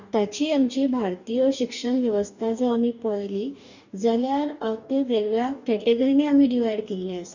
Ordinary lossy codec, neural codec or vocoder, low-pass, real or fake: none; codec, 44.1 kHz, 2.6 kbps, DAC; 7.2 kHz; fake